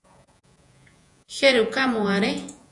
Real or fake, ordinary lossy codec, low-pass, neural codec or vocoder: fake; AAC, 64 kbps; 10.8 kHz; vocoder, 48 kHz, 128 mel bands, Vocos